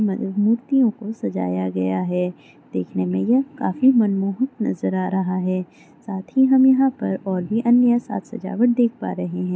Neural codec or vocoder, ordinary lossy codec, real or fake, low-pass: none; none; real; none